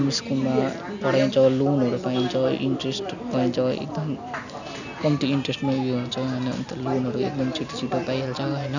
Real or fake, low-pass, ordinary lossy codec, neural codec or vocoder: real; 7.2 kHz; none; none